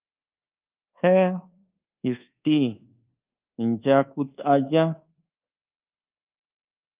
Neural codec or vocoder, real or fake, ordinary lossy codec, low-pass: codec, 24 kHz, 1.2 kbps, DualCodec; fake; Opus, 24 kbps; 3.6 kHz